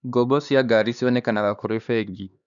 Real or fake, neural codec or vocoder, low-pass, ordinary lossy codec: fake; codec, 16 kHz, 2 kbps, X-Codec, HuBERT features, trained on LibriSpeech; 7.2 kHz; none